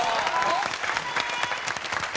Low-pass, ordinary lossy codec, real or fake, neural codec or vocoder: none; none; real; none